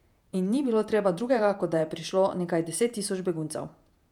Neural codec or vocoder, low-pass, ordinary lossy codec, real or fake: vocoder, 48 kHz, 128 mel bands, Vocos; 19.8 kHz; none; fake